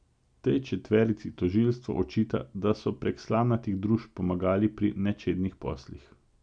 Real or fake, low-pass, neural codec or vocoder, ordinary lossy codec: real; 9.9 kHz; none; none